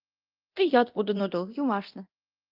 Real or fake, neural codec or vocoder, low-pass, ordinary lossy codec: fake; codec, 24 kHz, 0.9 kbps, DualCodec; 5.4 kHz; Opus, 32 kbps